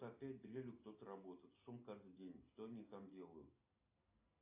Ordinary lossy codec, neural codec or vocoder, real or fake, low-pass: AAC, 32 kbps; none; real; 3.6 kHz